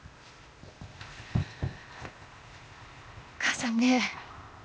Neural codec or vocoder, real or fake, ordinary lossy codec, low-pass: codec, 16 kHz, 0.8 kbps, ZipCodec; fake; none; none